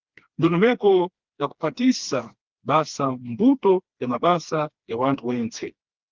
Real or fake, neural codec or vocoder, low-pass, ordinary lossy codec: fake; codec, 16 kHz, 2 kbps, FreqCodec, smaller model; 7.2 kHz; Opus, 32 kbps